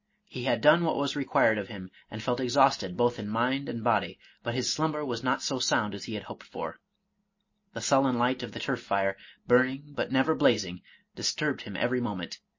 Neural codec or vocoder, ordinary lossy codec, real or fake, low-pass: none; MP3, 32 kbps; real; 7.2 kHz